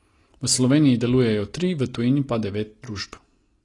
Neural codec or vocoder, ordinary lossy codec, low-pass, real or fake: none; AAC, 32 kbps; 10.8 kHz; real